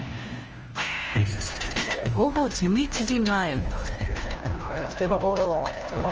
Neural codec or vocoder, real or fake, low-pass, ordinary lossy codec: codec, 16 kHz, 1 kbps, FunCodec, trained on LibriTTS, 50 frames a second; fake; 7.2 kHz; Opus, 24 kbps